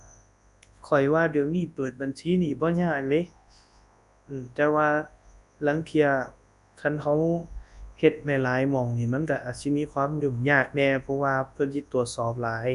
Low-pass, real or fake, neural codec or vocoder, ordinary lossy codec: 10.8 kHz; fake; codec, 24 kHz, 0.9 kbps, WavTokenizer, large speech release; none